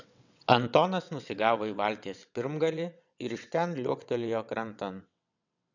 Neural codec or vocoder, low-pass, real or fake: vocoder, 22.05 kHz, 80 mel bands, WaveNeXt; 7.2 kHz; fake